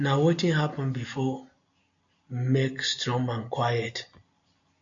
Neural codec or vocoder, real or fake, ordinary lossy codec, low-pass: none; real; AAC, 64 kbps; 7.2 kHz